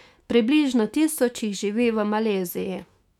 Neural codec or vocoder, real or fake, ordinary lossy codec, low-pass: autoencoder, 48 kHz, 128 numbers a frame, DAC-VAE, trained on Japanese speech; fake; none; 19.8 kHz